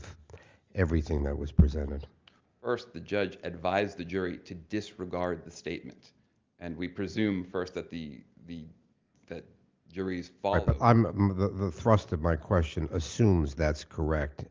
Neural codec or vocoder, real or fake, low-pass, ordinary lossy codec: none; real; 7.2 kHz; Opus, 32 kbps